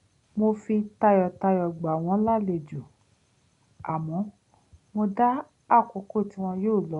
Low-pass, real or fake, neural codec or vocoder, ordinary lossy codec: 10.8 kHz; real; none; none